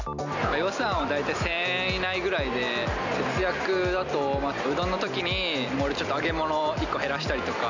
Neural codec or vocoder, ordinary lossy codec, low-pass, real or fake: none; none; 7.2 kHz; real